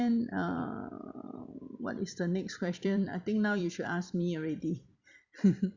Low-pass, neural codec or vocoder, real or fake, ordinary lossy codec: 7.2 kHz; vocoder, 44.1 kHz, 128 mel bands every 256 samples, BigVGAN v2; fake; Opus, 64 kbps